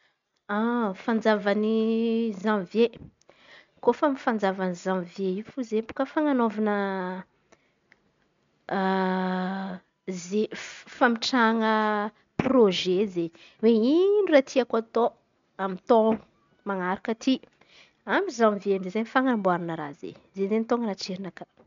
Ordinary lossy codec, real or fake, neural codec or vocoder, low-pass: MP3, 64 kbps; real; none; 7.2 kHz